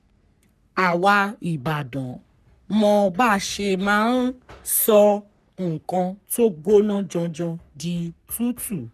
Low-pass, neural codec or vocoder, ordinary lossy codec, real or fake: 14.4 kHz; codec, 44.1 kHz, 3.4 kbps, Pupu-Codec; none; fake